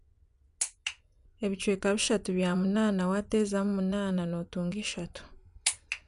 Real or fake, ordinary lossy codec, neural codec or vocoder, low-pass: fake; none; vocoder, 24 kHz, 100 mel bands, Vocos; 10.8 kHz